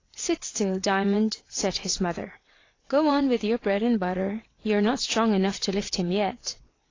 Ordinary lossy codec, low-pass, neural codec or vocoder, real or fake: AAC, 32 kbps; 7.2 kHz; vocoder, 22.05 kHz, 80 mel bands, WaveNeXt; fake